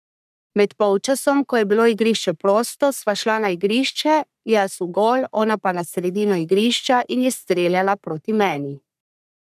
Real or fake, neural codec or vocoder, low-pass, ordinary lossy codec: fake; codec, 44.1 kHz, 3.4 kbps, Pupu-Codec; 14.4 kHz; none